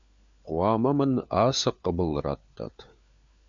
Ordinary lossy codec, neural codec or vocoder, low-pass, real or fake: MP3, 64 kbps; codec, 16 kHz, 4 kbps, FunCodec, trained on LibriTTS, 50 frames a second; 7.2 kHz; fake